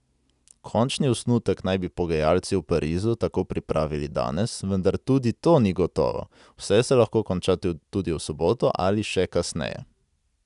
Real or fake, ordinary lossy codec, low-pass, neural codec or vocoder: real; none; 10.8 kHz; none